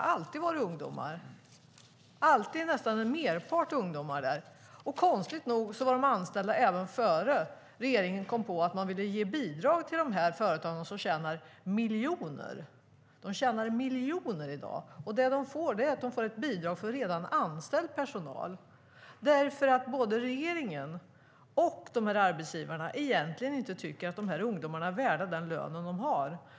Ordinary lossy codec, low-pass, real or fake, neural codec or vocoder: none; none; real; none